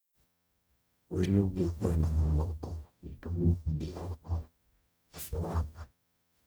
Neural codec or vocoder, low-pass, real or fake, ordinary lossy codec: codec, 44.1 kHz, 0.9 kbps, DAC; none; fake; none